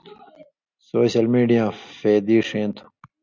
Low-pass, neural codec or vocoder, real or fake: 7.2 kHz; none; real